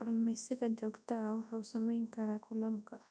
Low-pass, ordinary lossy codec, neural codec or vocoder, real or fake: 9.9 kHz; none; codec, 24 kHz, 0.9 kbps, WavTokenizer, large speech release; fake